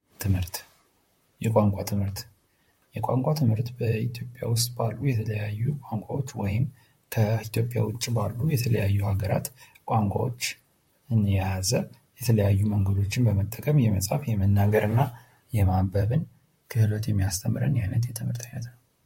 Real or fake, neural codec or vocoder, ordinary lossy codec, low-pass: fake; vocoder, 44.1 kHz, 128 mel bands, Pupu-Vocoder; MP3, 64 kbps; 19.8 kHz